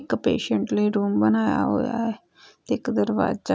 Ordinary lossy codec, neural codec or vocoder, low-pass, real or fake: none; none; none; real